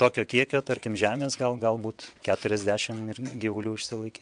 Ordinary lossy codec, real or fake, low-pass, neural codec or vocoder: MP3, 64 kbps; fake; 9.9 kHz; vocoder, 22.05 kHz, 80 mel bands, WaveNeXt